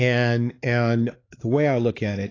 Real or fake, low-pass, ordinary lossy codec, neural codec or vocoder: fake; 7.2 kHz; AAC, 32 kbps; codec, 16 kHz, 4 kbps, X-Codec, HuBERT features, trained on LibriSpeech